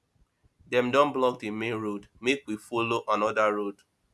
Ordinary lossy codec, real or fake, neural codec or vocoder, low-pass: none; real; none; none